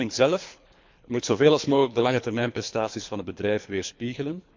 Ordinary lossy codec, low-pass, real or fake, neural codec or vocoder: MP3, 64 kbps; 7.2 kHz; fake; codec, 24 kHz, 3 kbps, HILCodec